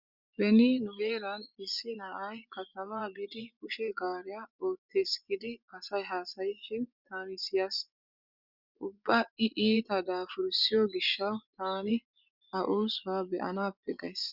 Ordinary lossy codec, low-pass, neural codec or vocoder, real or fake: Opus, 64 kbps; 5.4 kHz; vocoder, 24 kHz, 100 mel bands, Vocos; fake